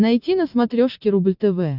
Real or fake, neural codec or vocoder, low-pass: real; none; 5.4 kHz